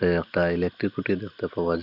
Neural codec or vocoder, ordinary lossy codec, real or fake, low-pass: none; none; real; 5.4 kHz